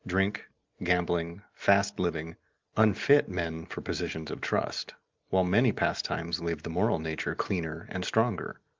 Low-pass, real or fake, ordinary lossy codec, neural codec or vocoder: 7.2 kHz; real; Opus, 32 kbps; none